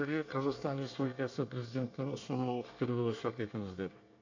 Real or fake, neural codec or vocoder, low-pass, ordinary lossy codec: fake; codec, 24 kHz, 1 kbps, SNAC; 7.2 kHz; AAC, 48 kbps